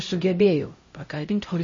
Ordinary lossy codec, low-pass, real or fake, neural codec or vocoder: MP3, 32 kbps; 7.2 kHz; fake; codec, 16 kHz, 0.5 kbps, X-Codec, HuBERT features, trained on LibriSpeech